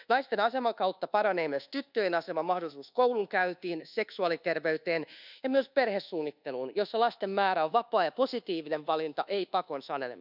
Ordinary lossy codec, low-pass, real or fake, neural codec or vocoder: none; 5.4 kHz; fake; codec, 24 kHz, 1.2 kbps, DualCodec